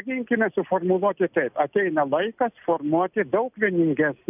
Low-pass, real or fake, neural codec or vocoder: 3.6 kHz; real; none